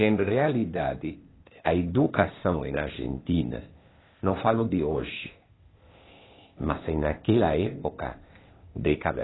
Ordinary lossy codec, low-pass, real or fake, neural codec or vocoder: AAC, 16 kbps; 7.2 kHz; fake; codec, 16 kHz, 0.8 kbps, ZipCodec